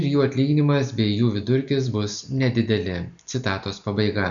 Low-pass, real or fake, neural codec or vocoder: 7.2 kHz; real; none